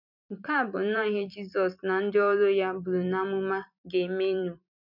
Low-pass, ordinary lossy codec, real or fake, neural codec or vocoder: 5.4 kHz; none; fake; vocoder, 44.1 kHz, 128 mel bands every 256 samples, BigVGAN v2